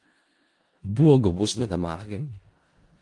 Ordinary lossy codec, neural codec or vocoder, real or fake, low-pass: Opus, 24 kbps; codec, 16 kHz in and 24 kHz out, 0.4 kbps, LongCat-Audio-Codec, four codebook decoder; fake; 10.8 kHz